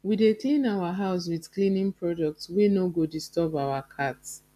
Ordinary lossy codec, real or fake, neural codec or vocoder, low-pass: AAC, 96 kbps; real; none; 14.4 kHz